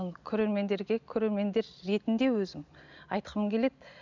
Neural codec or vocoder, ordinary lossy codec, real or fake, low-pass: none; none; real; 7.2 kHz